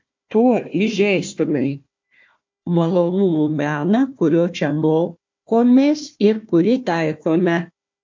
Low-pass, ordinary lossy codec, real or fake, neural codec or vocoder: 7.2 kHz; MP3, 48 kbps; fake; codec, 16 kHz, 1 kbps, FunCodec, trained on Chinese and English, 50 frames a second